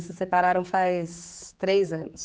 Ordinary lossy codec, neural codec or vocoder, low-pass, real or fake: none; codec, 16 kHz, 4 kbps, X-Codec, HuBERT features, trained on general audio; none; fake